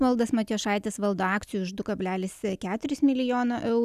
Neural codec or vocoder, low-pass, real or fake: none; 14.4 kHz; real